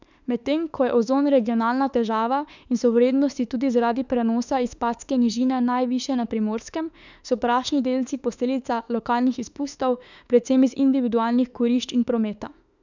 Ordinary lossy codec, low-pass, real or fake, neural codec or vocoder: none; 7.2 kHz; fake; autoencoder, 48 kHz, 32 numbers a frame, DAC-VAE, trained on Japanese speech